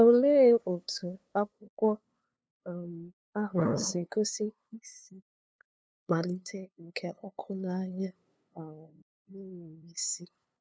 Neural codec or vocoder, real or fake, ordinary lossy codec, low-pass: codec, 16 kHz, 2 kbps, FunCodec, trained on LibriTTS, 25 frames a second; fake; none; none